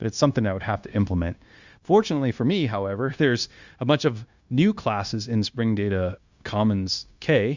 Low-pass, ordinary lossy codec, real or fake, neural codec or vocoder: 7.2 kHz; Opus, 64 kbps; fake; codec, 16 kHz, 0.9 kbps, LongCat-Audio-Codec